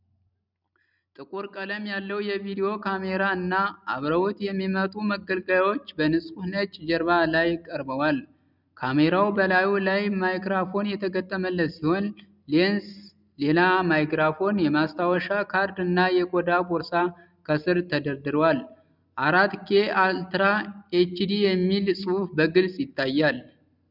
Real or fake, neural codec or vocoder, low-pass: real; none; 5.4 kHz